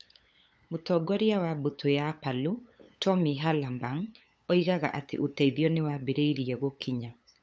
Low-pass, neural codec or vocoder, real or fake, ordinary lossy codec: none; codec, 16 kHz, 8 kbps, FunCodec, trained on LibriTTS, 25 frames a second; fake; none